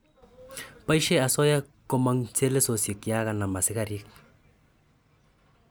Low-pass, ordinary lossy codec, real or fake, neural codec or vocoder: none; none; real; none